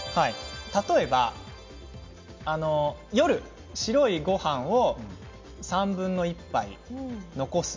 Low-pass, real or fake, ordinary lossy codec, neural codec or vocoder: 7.2 kHz; real; MP3, 48 kbps; none